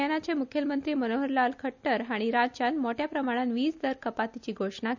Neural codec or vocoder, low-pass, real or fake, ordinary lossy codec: none; 7.2 kHz; real; none